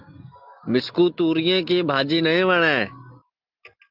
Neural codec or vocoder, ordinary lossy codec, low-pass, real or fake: none; Opus, 32 kbps; 5.4 kHz; real